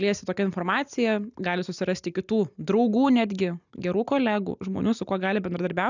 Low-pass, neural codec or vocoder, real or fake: 7.2 kHz; none; real